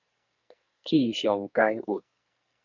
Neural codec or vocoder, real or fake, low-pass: codec, 44.1 kHz, 2.6 kbps, SNAC; fake; 7.2 kHz